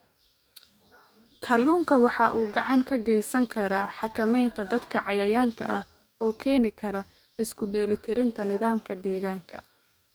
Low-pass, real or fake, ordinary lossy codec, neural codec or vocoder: none; fake; none; codec, 44.1 kHz, 2.6 kbps, DAC